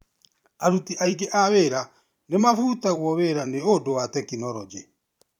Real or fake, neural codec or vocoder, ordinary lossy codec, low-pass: fake; vocoder, 44.1 kHz, 128 mel bands every 512 samples, BigVGAN v2; none; 19.8 kHz